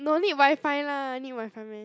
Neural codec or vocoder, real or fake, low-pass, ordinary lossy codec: none; real; none; none